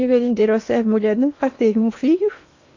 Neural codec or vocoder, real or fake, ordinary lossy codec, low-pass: codec, 16 kHz in and 24 kHz out, 0.8 kbps, FocalCodec, streaming, 65536 codes; fake; AAC, 48 kbps; 7.2 kHz